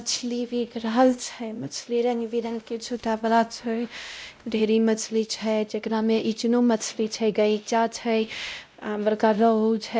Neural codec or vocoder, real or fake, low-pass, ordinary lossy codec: codec, 16 kHz, 0.5 kbps, X-Codec, WavLM features, trained on Multilingual LibriSpeech; fake; none; none